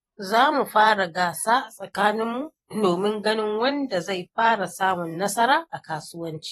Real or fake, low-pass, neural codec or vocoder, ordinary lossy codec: fake; 19.8 kHz; vocoder, 44.1 kHz, 128 mel bands every 512 samples, BigVGAN v2; AAC, 32 kbps